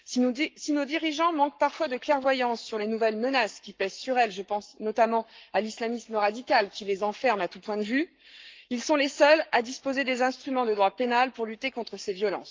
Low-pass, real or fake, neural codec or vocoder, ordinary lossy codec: 7.2 kHz; fake; codec, 44.1 kHz, 7.8 kbps, Pupu-Codec; Opus, 24 kbps